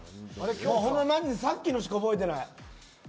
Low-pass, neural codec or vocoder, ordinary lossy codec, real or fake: none; none; none; real